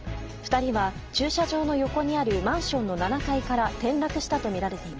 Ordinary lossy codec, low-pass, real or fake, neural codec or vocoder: Opus, 24 kbps; 7.2 kHz; real; none